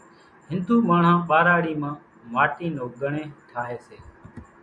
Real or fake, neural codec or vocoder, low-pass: real; none; 9.9 kHz